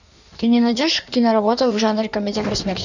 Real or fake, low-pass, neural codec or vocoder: fake; 7.2 kHz; codec, 16 kHz in and 24 kHz out, 1.1 kbps, FireRedTTS-2 codec